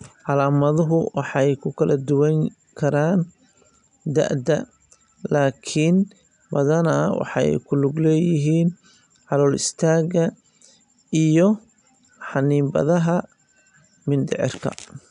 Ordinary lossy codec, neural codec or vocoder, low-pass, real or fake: none; none; 10.8 kHz; real